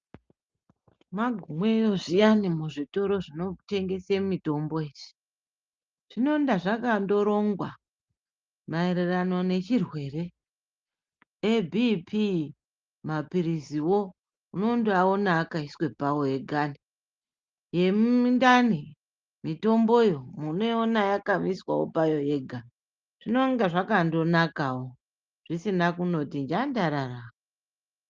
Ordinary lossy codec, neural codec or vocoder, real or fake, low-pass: Opus, 24 kbps; none; real; 7.2 kHz